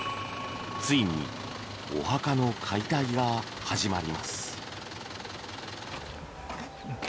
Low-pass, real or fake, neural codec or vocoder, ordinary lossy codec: none; real; none; none